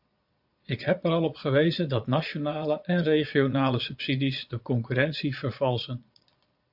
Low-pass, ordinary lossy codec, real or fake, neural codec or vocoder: 5.4 kHz; AAC, 48 kbps; fake; vocoder, 22.05 kHz, 80 mel bands, Vocos